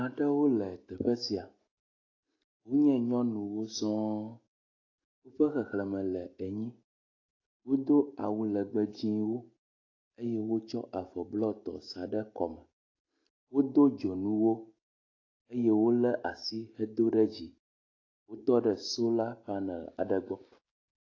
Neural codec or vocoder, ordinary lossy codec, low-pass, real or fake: none; AAC, 32 kbps; 7.2 kHz; real